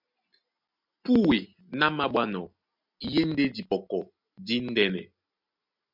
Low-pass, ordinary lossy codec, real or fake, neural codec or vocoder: 5.4 kHz; MP3, 48 kbps; real; none